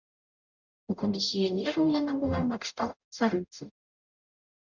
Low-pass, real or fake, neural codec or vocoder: 7.2 kHz; fake; codec, 44.1 kHz, 0.9 kbps, DAC